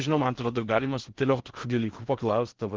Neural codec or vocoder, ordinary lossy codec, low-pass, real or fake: codec, 16 kHz in and 24 kHz out, 0.6 kbps, FocalCodec, streaming, 4096 codes; Opus, 16 kbps; 7.2 kHz; fake